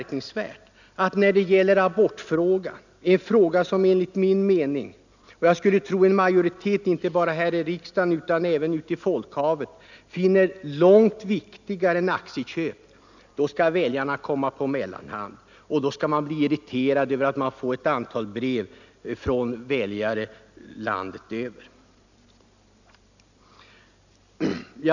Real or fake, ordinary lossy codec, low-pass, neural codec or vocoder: real; none; 7.2 kHz; none